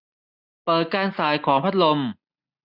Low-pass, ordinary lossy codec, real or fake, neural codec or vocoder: 5.4 kHz; none; real; none